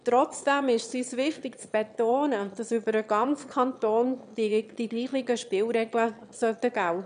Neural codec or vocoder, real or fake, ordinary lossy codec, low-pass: autoencoder, 22.05 kHz, a latent of 192 numbers a frame, VITS, trained on one speaker; fake; none; 9.9 kHz